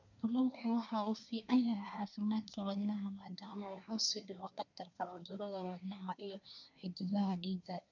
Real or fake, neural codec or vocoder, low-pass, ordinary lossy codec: fake; codec, 24 kHz, 1 kbps, SNAC; 7.2 kHz; none